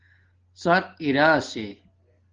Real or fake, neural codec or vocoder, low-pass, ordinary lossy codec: real; none; 7.2 kHz; Opus, 16 kbps